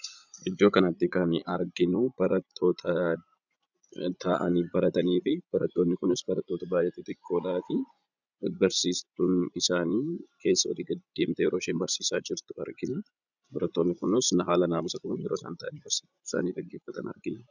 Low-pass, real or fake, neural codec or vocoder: 7.2 kHz; fake; vocoder, 44.1 kHz, 80 mel bands, Vocos